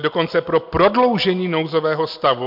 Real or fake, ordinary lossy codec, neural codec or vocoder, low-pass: real; MP3, 48 kbps; none; 5.4 kHz